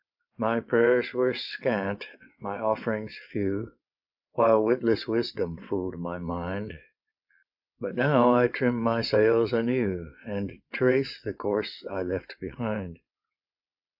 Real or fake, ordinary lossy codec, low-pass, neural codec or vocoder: fake; AAC, 48 kbps; 5.4 kHz; vocoder, 22.05 kHz, 80 mel bands, WaveNeXt